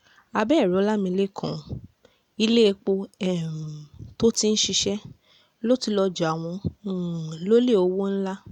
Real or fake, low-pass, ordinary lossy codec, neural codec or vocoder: real; 19.8 kHz; none; none